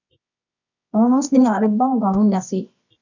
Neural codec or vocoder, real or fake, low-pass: codec, 24 kHz, 0.9 kbps, WavTokenizer, medium music audio release; fake; 7.2 kHz